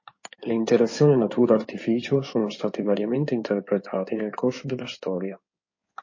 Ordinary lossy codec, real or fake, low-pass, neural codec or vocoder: MP3, 32 kbps; fake; 7.2 kHz; codec, 16 kHz in and 24 kHz out, 2.2 kbps, FireRedTTS-2 codec